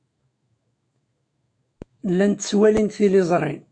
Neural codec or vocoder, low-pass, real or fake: autoencoder, 48 kHz, 128 numbers a frame, DAC-VAE, trained on Japanese speech; 9.9 kHz; fake